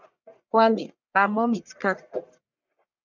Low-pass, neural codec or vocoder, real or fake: 7.2 kHz; codec, 44.1 kHz, 1.7 kbps, Pupu-Codec; fake